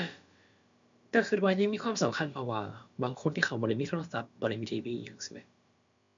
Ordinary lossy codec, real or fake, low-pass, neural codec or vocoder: MP3, 64 kbps; fake; 7.2 kHz; codec, 16 kHz, about 1 kbps, DyCAST, with the encoder's durations